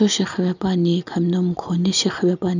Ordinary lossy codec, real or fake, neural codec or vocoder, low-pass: none; real; none; 7.2 kHz